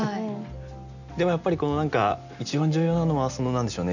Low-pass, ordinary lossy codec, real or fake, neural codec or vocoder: 7.2 kHz; none; real; none